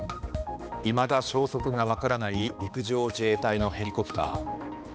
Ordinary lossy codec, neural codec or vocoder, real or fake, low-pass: none; codec, 16 kHz, 2 kbps, X-Codec, HuBERT features, trained on balanced general audio; fake; none